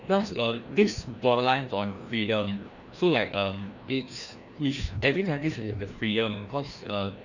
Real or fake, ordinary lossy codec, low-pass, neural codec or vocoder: fake; none; 7.2 kHz; codec, 16 kHz, 1 kbps, FreqCodec, larger model